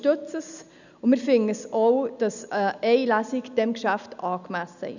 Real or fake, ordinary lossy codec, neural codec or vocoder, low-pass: real; none; none; 7.2 kHz